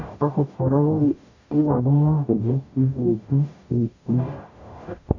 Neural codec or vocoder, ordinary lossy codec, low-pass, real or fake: codec, 44.1 kHz, 0.9 kbps, DAC; none; 7.2 kHz; fake